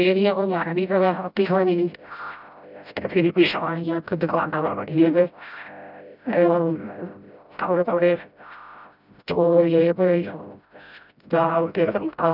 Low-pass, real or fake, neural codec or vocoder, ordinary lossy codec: 5.4 kHz; fake; codec, 16 kHz, 0.5 kbps, FreqCodec, smaller model; none